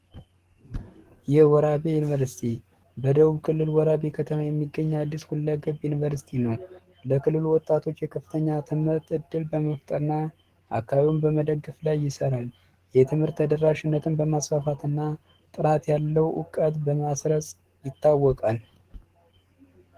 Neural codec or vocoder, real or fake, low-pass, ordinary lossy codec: codec, 44.1 kHz, 7.8 kbps, DAC; fake; 14.4 kHz; Opus, 16 kbps